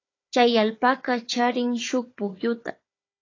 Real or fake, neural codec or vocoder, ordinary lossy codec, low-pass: fake; codec, 16 kHz, 4 kbps, FunCodec, trained on Chinese and English, 50 frames a second; AAC, 32 kbps; 7.2 kHz